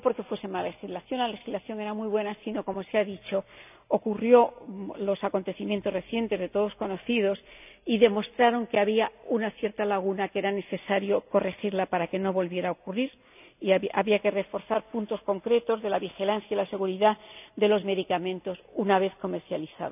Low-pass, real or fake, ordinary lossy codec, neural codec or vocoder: 3.6 kHz; real; none; none